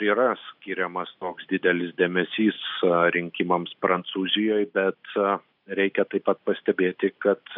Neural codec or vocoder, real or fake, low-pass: none; real; 5.4 kHz